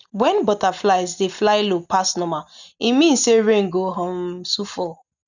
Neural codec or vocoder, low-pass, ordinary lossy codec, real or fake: none; 7.2 kHz; none; real